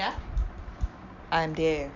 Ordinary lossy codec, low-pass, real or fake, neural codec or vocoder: none; 7.2 kHz; real; none